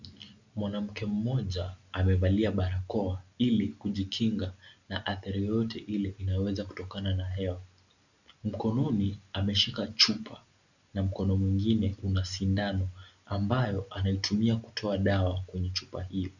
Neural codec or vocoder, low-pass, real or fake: none; 7.2 kHz; real